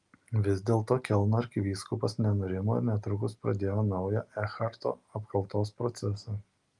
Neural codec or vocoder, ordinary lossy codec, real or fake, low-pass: none; Opus, 32 kbps; real; 10.8 kHz